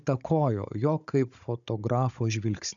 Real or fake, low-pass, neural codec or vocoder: fake; 7.2 kHz; codec, 16 kHz, 16 kbps, FunCodec, trained on Chinese and English, 50 frames a second